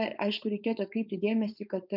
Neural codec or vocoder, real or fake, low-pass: codec, 16 kHz, 4.8 kbps, FACodec; fake; 5.4 kHz